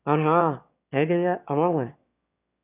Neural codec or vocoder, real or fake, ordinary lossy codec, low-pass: autoencoder, 22.05 kHz, a latent of 192 numbers a frame, VITS, trained on one speaker; fake; none; 3.6 kHz